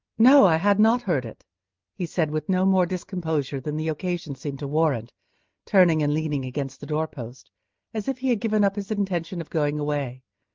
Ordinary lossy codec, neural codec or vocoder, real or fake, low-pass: Opus, 24 kbps; none; real; 7.2 kHz